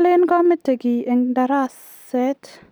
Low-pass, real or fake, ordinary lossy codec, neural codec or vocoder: none; real; none; none